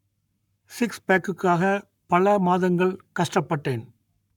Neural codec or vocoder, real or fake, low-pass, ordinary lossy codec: codec, 44.1 kHz, 7.8 kbps, Pupu-Codec; fake; 19.8 kHz; none